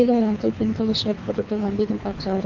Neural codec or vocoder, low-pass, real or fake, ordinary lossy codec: codec, 24 kHz, 3 kbps, HILCodec; 7.2 kHz; fake; none